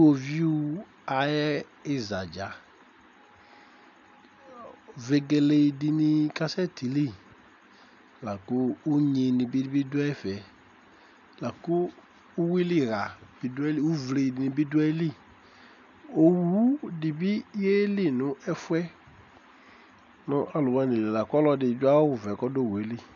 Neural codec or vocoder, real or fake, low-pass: none; real; 7.2 kHz